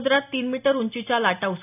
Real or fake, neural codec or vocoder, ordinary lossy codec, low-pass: real; none; none; 3.6 kHz